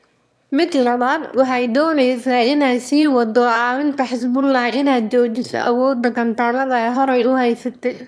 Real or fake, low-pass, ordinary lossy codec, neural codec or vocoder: fake; none; none; autoencoder, 22.05 kHz, a latent of 192 numbers a frame, VITS, trained on one speaker